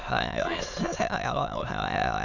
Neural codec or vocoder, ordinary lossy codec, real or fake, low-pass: autoencoder, 22.05 kHz, a latent of 192 numbers a frame, VITS, trained on many speakers; none; fake; 7.2 kHz